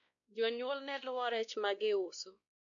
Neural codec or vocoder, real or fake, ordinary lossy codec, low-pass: codec, 16 kHz, 1 kbps, X-Codec, WavLM features, trained on Multilingual LibriSpeech; fake; none; 7.2 kHz